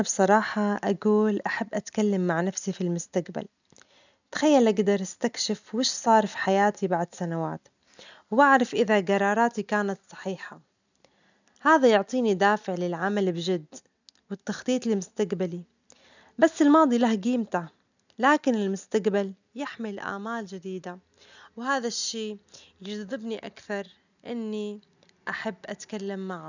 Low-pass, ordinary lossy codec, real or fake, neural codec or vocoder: 7.2 kHz; none; real; none